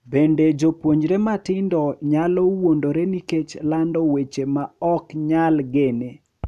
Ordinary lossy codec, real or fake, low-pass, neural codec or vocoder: none; real; 14.4 kHz; none